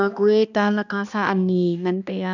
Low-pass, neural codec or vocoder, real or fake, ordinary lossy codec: 7.2 kHz; codec, 16 kHz, 2 kbps, X-Codec, HuBERT features, trained on balanced general audio; fake; none